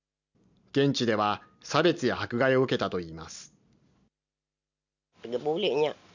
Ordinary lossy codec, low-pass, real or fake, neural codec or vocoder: none; 7.2 kHz; real; none